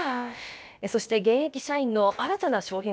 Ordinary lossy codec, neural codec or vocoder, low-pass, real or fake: none; codec, 16 kHz, about 1 kbps, DyCAST, with the encoder's durations; none; fake